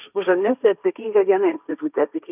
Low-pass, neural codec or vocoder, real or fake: 3.6 kHz; codec, 16 kHz, 1.1 kbps, Voila-Tokenizer; fake